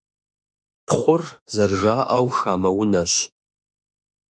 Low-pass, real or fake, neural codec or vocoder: 9.9 kHz; fake; autoencoder, 48 kHz, 32 numbers a frame, DAC-VAE, trained on Japanese speech